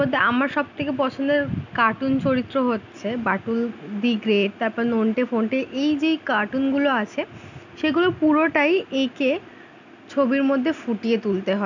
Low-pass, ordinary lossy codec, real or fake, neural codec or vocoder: 7.2 kHz; none; real; none